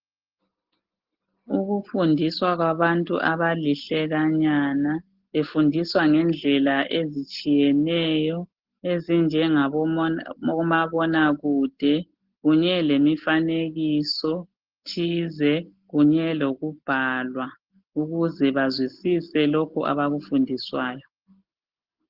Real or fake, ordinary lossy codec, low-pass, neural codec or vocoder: real; Opus, 16 kbps; 5.4 kHz; none